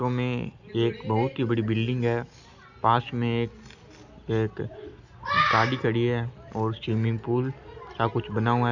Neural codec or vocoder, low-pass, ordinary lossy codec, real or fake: none; 7.2 kHz; none; real